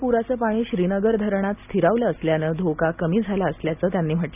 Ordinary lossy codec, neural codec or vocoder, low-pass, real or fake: none; none; 3.6 kHz; real